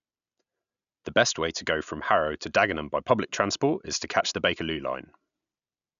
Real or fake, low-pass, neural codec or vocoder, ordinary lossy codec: real; 7.2 kHz; none; none